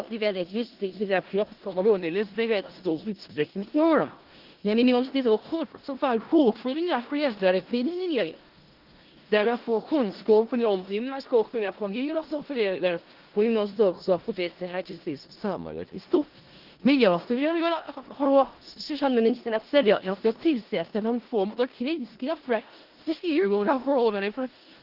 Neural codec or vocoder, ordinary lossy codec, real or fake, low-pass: codec, 16 kHz in and 24 kHz out, 0.4 kbps, LongCat-Audio-Codec, four codebook decoder; Opus, 16 kbps; fake; 5.4 kHz